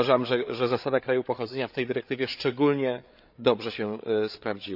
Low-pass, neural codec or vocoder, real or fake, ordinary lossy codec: 5.4 kHz; codec, 16 kHz, 8 kbps, FreqCodec, larger model; fake; none